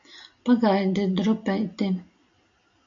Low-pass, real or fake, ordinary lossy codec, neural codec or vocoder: 7.2 kHz; real; AAC, 64 kbps; none